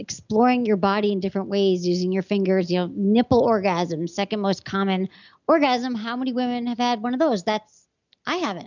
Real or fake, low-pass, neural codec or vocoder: real; 7.2 kHz; none